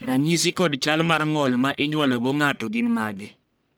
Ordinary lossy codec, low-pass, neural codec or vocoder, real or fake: none; none; codec, 44.1 kHz, 1.7 kbps, Pupu-Codec; fake